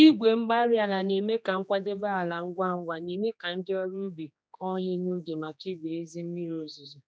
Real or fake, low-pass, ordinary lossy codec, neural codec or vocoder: fake; none; none; codec, 16 kHz, 2 kbps, X-Codec, HuBERT features, trained on general audio